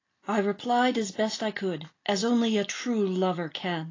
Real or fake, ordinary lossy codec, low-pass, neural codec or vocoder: real; AAC, 32 kbps; 7.2 kHz; none